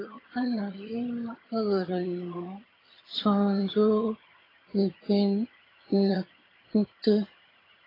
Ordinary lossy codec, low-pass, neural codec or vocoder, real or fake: AAC, 24 kbps; 5.4 kHz; vocoder, 22.05 kHz, 80 mel bands, HiFi-GAN; fake